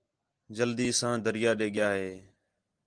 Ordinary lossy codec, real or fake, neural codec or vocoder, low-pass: Opus, 24 kbps; real; none; 9.9 kHz